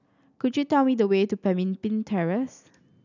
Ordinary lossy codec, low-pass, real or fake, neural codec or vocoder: none; 7.2 kHz; real; none